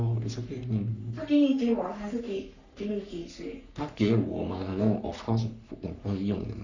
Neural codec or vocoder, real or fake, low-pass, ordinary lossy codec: codec, 44.1 kHz, 3.4 kbps, Pupu-Codec; fake; 7.2 kHz; none